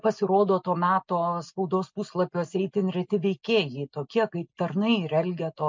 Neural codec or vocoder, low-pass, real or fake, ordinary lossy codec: none; 7.2 kHz; real; MP3, 64 kbps